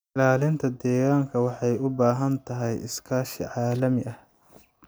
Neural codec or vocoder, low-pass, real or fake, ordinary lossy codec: none; none; real; none